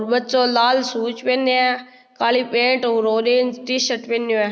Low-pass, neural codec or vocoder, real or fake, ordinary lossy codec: none; none; real; none